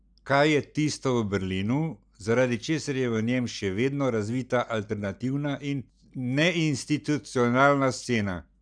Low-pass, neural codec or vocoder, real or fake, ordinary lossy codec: 9.9 kHz; none; real; none